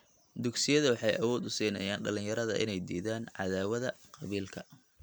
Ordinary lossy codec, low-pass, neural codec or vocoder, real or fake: none; none; none; real